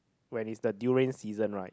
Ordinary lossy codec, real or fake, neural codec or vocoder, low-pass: none; real; none; none